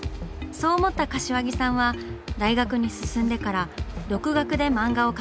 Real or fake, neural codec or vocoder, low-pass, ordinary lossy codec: real; none; none; none